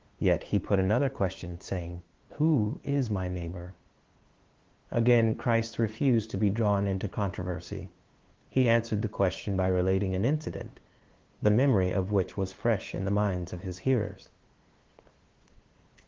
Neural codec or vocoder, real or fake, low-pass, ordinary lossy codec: codec, 16 kHz, 2 kbps, FunCodec, trained on LibriTTS, 25 frames a second; fake; 7.2 kHz; Opus, 16 kbps